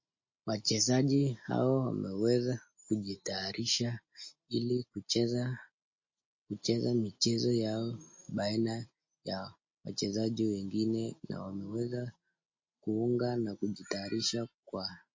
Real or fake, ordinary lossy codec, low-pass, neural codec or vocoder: real; MP3, 32 kbps; 7.2 kHz; none